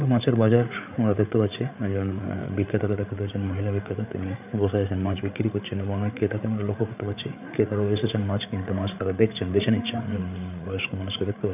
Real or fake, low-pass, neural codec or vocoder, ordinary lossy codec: fake; 3.6 kHz; codec, 16 kHz, 8 kbps, FreqCodec, larger model; none